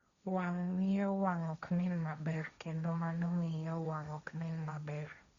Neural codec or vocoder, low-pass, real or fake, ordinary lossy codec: codec, 16 kHz, 1.1 kbps, Voila-Tokenizer; 7.2 kHz; fake; Opus, 64 kbps